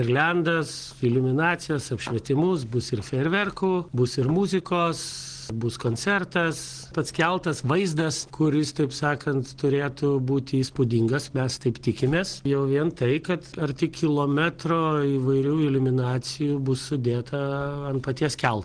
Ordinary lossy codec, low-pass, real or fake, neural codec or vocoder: Opus, 16 kbps; 9.9 kHz; real; none